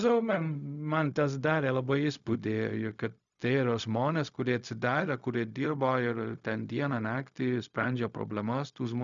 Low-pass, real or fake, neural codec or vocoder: 7.2 kHz; fake; codec, 16 kHz, 0.4 kbps, LongCat-Audio-Codec